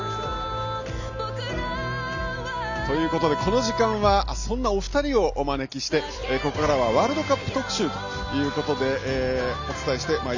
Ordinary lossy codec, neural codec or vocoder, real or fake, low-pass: none; none; real; 7.2 kHz